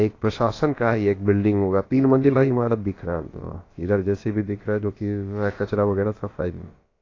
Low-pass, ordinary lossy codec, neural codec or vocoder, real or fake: 7.2 kHz; AAC, 32 kbps; codec, 16 kHz, about 1 kbps, DyCAST, with the encoder's durations; fake